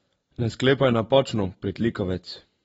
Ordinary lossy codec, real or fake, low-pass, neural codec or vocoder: AAC, 24 kbps; fake; 19.8 kHz; vocoder, 44.1 kHz, 128 mel bands every 256 samples, BigVGAN v2